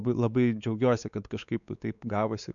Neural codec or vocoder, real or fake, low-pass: codec, 16 kHz, 4 kbps, X-Codec, WavLM features, trained on Multilingual LibriSpeech; fake; 7.2 kHz